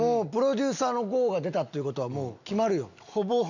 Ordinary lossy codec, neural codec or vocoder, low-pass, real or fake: none; none; 7.2 kHz; real